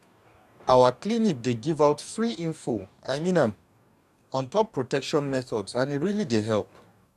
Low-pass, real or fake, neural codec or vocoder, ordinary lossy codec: 14.4 kHz; fake; codec, 44.1 kHz, 2.6 kbps, DAC; none